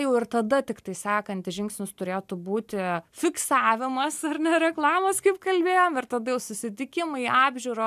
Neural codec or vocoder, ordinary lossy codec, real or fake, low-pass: none; AAC, 96 kbps; real; 14.4 kHz